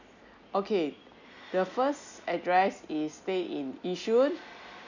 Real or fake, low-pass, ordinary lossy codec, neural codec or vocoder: real; 7.2 kHz; none; none